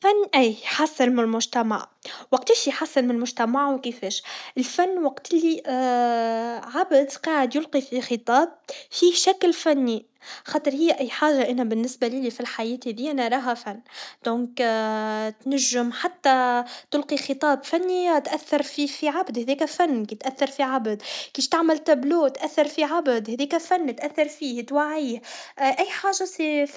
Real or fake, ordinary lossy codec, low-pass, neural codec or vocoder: real; none; none; none